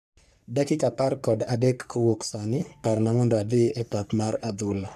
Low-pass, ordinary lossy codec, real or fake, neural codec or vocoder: 14.4 kHz; none; fake; codec, 44.1 kHz, 3.4 kbps, Pupu-Codec